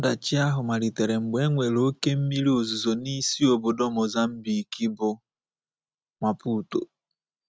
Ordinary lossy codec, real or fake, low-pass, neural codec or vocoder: none; real; none; none